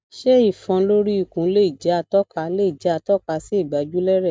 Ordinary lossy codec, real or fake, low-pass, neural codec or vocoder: none; real; none; none